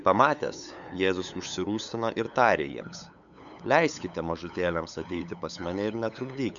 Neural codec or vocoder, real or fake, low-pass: codec, 16 kHz, 8 kbps, FunCodec, trained on LibriTTS, 25 frames a second; fake; 7.2 kHz